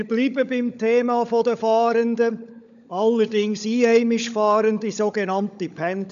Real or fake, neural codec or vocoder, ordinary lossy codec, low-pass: fake; codec, 16 kHz, 16 kbps, FunCodec, trained on LibriTTS, 50 frames a second; none; 7.2 kHz